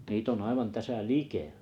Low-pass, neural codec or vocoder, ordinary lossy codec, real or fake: 19.8 kHz; none; none; real